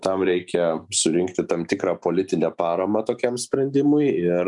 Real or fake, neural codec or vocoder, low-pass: real; none; 10.8 kHz